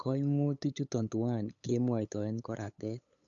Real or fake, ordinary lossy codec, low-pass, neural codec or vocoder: fake; none; 7.2 kHz; codec, 16 kHz, 8 kbps, FunCodec, trained on LibriTTS, 25 frames a second